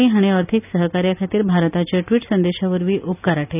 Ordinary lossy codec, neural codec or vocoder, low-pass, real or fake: none; none; 3.6 kHz; real